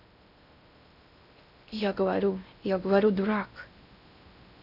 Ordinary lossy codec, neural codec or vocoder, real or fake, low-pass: none; codec, 16 kHz in and 24 kHz out, 0.6 kbps, FocalCodec, streaming, 2048 codes; fake; 5.4 kHz